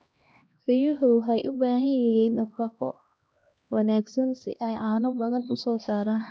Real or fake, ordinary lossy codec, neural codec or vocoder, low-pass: fake; none; codec, 16 kHz, 1 kbps, X-Codec, HuBERT features, trained on LibriSpeech; none